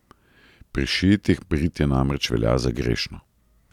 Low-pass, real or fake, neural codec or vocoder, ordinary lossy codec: 19.8 kHz; real; none; none